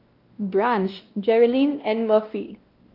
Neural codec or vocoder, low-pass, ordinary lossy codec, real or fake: codec, 16 kHz, 1 kbps, X-Codec, WavLM features, trained on Multilingual LibriSpeech; 5.4 kHz; Opus, 32 kbps; fake